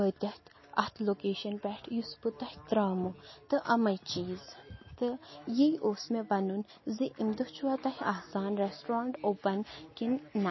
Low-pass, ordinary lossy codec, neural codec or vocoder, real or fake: 7.2 kHz; MP3, 24 kbps; none; real